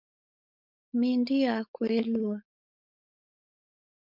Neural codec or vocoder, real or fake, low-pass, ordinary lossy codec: codec, 16 kHz, 4.8 kbps, FACodec; fake; 5.4 kHz; MP3, 48 kbps